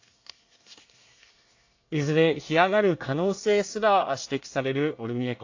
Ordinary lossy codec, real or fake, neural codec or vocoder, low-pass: AAC, 48 kbps; fake; codec, 24 kHz, 1 kbps, SNAC; 7.2 kHz